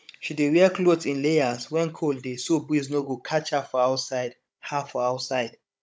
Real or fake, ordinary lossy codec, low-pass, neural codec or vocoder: fake; none; none; codec, 16 kHz, 16 kbps, FunCodec, trained on Chinese and English, 50 frames a second